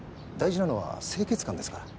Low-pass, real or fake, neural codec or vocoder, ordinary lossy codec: none; real; none; none